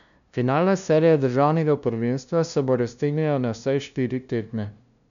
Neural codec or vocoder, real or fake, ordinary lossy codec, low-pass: codec, 16 kHz, 0.5 kbps, FunCodec, trained on LibriTTS, 25 frames a second; fake; none; 7.2 kHz